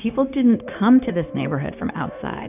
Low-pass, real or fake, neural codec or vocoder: 3.6 kHz; fake; codec, 16 kHz, 0.9 kbps, LongCat-Audio-Codec